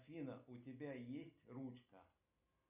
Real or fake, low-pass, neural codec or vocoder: real; 3.6 kHz; none